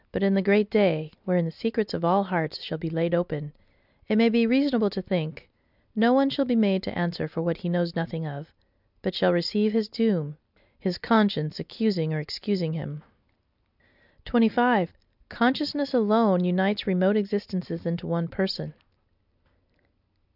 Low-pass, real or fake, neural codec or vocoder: 5.4 kHz; real; none